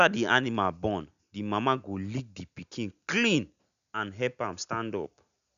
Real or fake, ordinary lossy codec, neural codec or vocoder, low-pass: real; none; none; 7.2 kHz